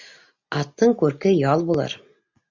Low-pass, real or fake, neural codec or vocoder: 7.2 kHz; real; none